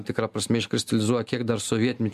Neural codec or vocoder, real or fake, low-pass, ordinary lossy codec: none; real; 14.4 kHz; MP3, 96 kbps